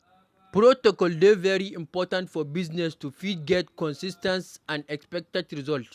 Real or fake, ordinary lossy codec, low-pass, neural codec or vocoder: real; none; 14.4 kHz; none